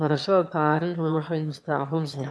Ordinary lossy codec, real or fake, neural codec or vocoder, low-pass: none; fake; autoencoder, 22.05 kHz, a latent of 192 numbers a frame, VITS, trained on one speaker; none